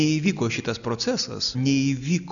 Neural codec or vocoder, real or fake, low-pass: none; real; 7.2 kHz